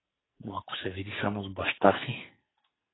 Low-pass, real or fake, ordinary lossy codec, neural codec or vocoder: 7.2 kHz; fake; AAC, 16 kbps; codec, 44.1 kHz, 2.6 kbps, SNAC